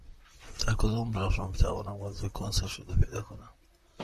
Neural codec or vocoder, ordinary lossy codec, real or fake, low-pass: vocoder, 44.1 kHz, 128 mel bands, Pupu-Vocoder; MP3, 64 kbps; fake; 14.4 kHz